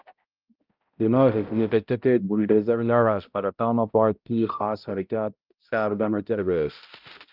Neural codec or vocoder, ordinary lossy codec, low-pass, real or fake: codec, 16 kHz, 0.5 kbps, X-Codec, HuBERT features, trained on balanced general audio; Opus, 24 kbps; 5.4 kHz; fake